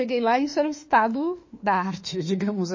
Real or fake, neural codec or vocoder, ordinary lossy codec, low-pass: fake; autoencoder, 48 kHz, 32 numbers a frame, DAC-VAE, trained on Japanese speech; MP3, 32 kbps; 7.2 kHz